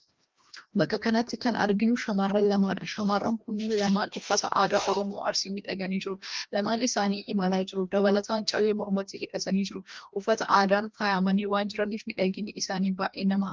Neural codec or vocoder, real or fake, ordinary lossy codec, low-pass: codec, 16 kHz, 1 kbps, FreqCodec, larger model; fake; Opus, 32 kbps; 7.2 kHz